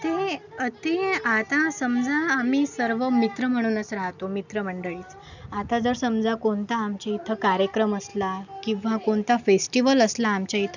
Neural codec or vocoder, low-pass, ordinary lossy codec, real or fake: none; 7.2 kHz; none; real